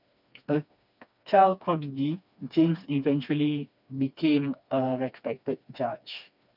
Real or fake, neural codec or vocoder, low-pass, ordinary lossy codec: fake; codec, 16 kHz, 2 kbps, FreqCodec, smaller model; 5.4 kHz; none